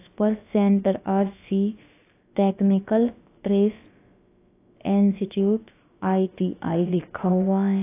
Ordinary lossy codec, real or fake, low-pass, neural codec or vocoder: AAC, 24 kbps; fake; 3.6 kHz; codec, 16 kHz, about 1 kbps, DyCAST, with the encoder's durations